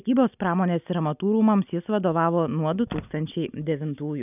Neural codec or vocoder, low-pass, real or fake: none; 3.6 kHz; real